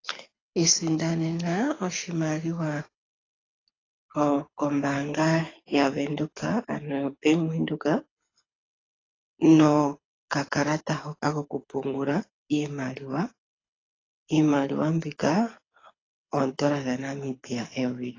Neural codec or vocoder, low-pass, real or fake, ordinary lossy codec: codec, 24 kHz, 6 kbps, HILCodec; 7.2 kHz; fake; AAC, 32 kbps